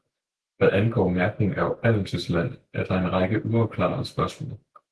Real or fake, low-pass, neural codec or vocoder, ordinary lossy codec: fake; 10.8 kHz; autoencoder, 48 kHz, 128 numbers a frame, DAC-VAE, trained on Japanese speech; Opus, 16 kbps